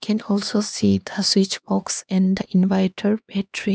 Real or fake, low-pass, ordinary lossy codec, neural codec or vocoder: fake; none; none; codec, 16 kHz, 2 kbps, X-Codec, HuBERT features, trained on LibriSpeech